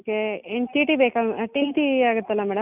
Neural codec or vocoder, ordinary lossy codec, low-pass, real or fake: none; none; 3.6 kHz; real